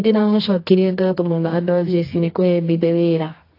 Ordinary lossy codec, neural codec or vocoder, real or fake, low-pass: AAC, 32 kbps; codec, 24 kHz, 0.9 kbps, WavTokenizer, medium music audio release; fake; 5.4 kHz